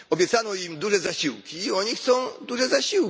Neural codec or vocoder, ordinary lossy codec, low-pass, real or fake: none; none; none; real